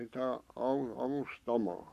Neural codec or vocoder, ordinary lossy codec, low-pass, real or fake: codec, 44.1 kHz, 7.8 kbps, Pupu-Codec; none; 14.4 kHz; fake